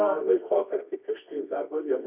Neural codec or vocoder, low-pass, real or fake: codec, 24 kHz, 0.9 kbps, WavTokenizer, medium music audio release; 3.6 kHz; fake